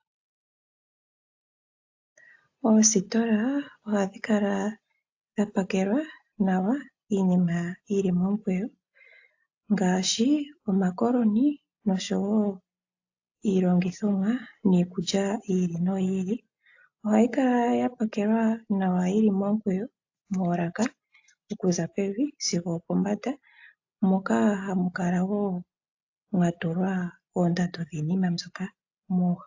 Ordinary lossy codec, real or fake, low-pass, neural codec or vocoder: AAC, 48 kbps; real; 7.2 kHz; none